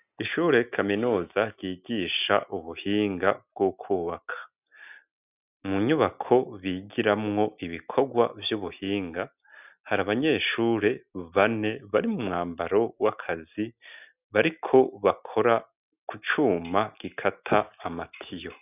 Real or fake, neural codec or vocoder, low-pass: real; none; 3.6 kHz